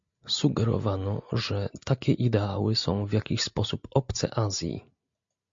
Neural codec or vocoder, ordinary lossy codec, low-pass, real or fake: none; MP3, 48 kbps; 7.2 kHz; real